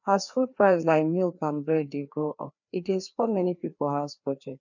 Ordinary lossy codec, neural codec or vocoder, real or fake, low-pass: none; codec, 16 kHz, 2 kbps, FreqCodec, larger model; fake; 7.2 kHz